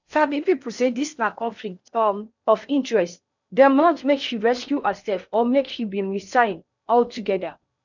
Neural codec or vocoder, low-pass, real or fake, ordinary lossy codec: codec, 16 kHz in and 24 kHz out, 0.8 kbps, FocalCodec, streaming, 65536 codes; 7.2 kHz; fake; none